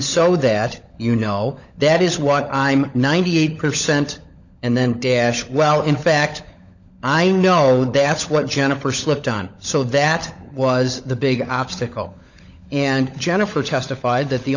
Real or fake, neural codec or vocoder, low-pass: fake; codec, 16 kHz, 16 kbps, FunCodec, trained on LibriTTS, 50 frames a second; 7.2 kHz